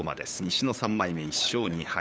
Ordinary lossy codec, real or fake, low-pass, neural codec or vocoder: none; fake; none; codec, 16 kHz, 8 kbps, FunCodec, trained on LibriTTS, 25 frames a second